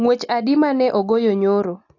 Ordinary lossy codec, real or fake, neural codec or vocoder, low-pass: AAC, 48 kbps; real; none; 7.2 kHz